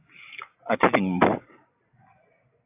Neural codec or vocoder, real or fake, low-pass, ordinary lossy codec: none; real; 3.6 kHz; AAC, 32 kbps